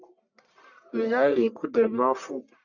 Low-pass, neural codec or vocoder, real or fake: 7.2 kHz; codec, 44.1 kHz, 1.7 kbps, Pupu-Codec; fake